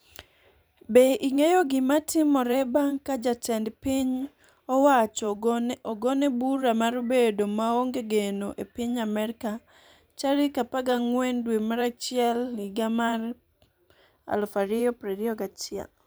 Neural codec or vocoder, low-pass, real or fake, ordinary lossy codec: vocoder, 44.1 kHz, 128 mel bands every 512 samples, BigVGAN v2; none; fake; none